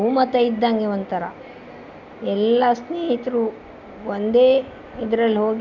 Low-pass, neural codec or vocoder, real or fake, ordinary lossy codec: 7.2 kHz; none; real; none